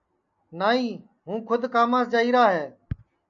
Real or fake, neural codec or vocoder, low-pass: real; none; 7.2 kHz